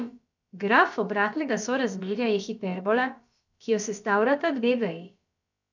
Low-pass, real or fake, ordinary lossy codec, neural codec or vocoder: 7.2 kHz; fake; none; codec, 16 kHz, about 1 kbps, DyCAST, with the encoder's durations